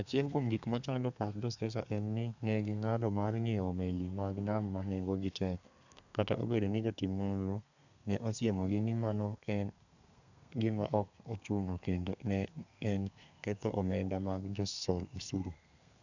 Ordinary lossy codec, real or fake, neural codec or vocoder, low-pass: none; fake; codec, 32 kHz, 1.9 kbps, SNAC; 7.2 kHz